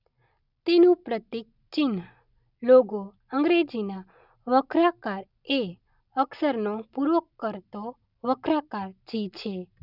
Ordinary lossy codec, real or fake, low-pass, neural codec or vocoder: none; real; 5.4 kHz; none